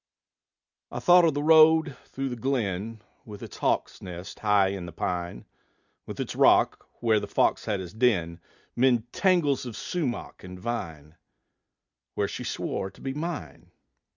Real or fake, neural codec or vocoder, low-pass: real; none; 7.2 kHz